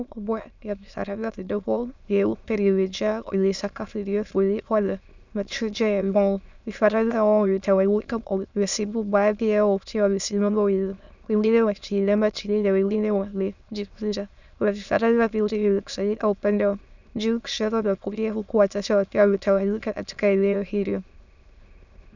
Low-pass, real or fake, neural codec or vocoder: 7.2 kHz; fake; autoencoder, 22.05 kHz, a latent of 192 numbers a frame, VITS, trained on many speakers